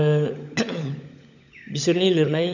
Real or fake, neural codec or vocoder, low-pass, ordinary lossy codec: fake; codec, 16 kHz, 16 kbps, FunCodec, trained on LibriTTS, 50 frames a second; 7.2 kHz; none